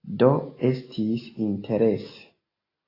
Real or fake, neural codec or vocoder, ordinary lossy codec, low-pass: real; none; AAC, 24 kbps; 5.4 kHz